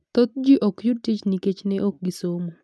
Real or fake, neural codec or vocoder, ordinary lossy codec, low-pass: real; none; none; none